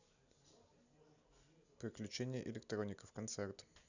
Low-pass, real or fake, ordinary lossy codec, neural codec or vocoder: 7.2 kHz; real; none; none